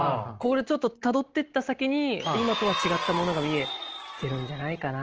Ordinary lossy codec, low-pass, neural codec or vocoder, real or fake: Opus, 16 kbps; 7.2 kHz; none; real